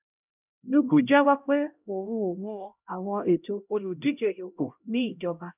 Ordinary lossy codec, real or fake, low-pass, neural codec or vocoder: none; fake; 3.6 kHz; codec, 16 kHz, 0.5 kbps, X-Codec, HuBERT features, trained on LibriSpeech